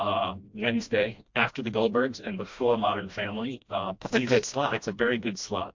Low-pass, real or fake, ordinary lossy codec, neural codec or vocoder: 7.2 kHz; fake; MP3, 48 kbps; codec, 16 kHz, 1 kbps, FreqCodec, smaller model